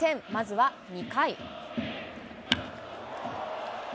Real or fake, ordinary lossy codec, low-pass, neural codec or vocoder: real; none; none; none